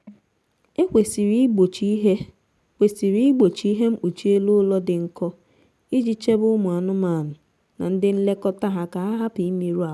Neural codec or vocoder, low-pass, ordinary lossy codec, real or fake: none; none; none; real